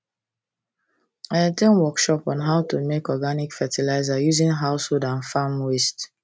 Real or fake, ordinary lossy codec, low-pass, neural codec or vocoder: real; none; none; none